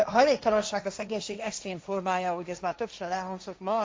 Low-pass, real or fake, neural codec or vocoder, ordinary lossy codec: none; fake; codec, 16 kHz, 1.1 kbps, Voila-Tokenizer; none